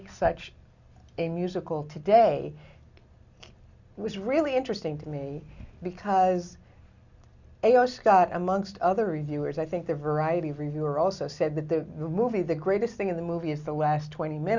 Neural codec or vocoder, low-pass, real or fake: none; 7.2 kHz; real